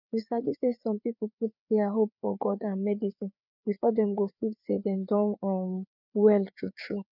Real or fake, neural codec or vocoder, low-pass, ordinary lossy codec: fake; codec, 16 kHz, 4 kbps, FreqCodec, larger model; 5.4 kHz; none